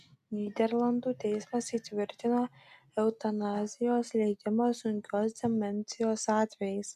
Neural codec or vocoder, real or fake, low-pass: none; real; 14.4 kHz